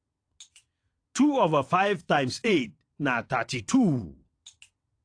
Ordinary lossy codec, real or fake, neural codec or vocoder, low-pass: AAC, 48 kbps; fake; vocoder, 22.05 kHz, 80 mel bands, WaveNeXt; 9.9 kHz